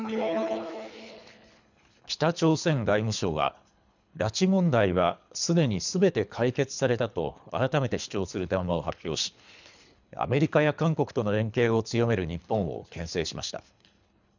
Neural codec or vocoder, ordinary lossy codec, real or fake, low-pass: codec, 24 kHz, 3 kbps, HILCodec; none; fake; 7.2 kHz